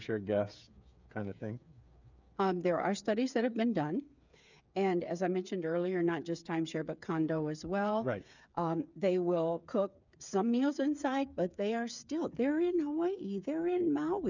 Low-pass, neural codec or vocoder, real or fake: 7.2 kHz; codec, 16 kHz, 8 kbps, FreqCodec, smaller model; fake